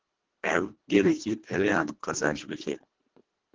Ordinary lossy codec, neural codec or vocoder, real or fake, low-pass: Opus, 16 kbps; codec, 24 kHz, 1.5 kbps, HILCodec; fake; 7.2 kHz